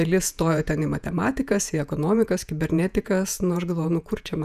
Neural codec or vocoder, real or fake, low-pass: vocoder, 48 kHz, 128 mel bands, Vocos; fake; 14.4 kHz